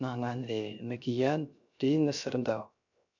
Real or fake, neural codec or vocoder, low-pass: fake; codec, 16 kHz, 0.3 kbps, FocalCodec; 7.2 kHz